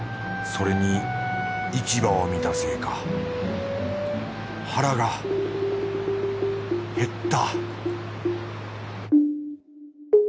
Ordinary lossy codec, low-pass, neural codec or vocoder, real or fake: none; none; none; real